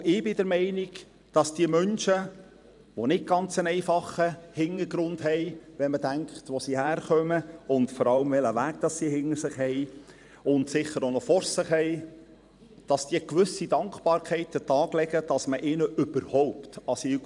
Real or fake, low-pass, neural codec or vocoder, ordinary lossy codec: fake; 10.8 kHz; vocoder, 44.1 kHz, 128 mel bands every 512 samples, BigVGAN v2; none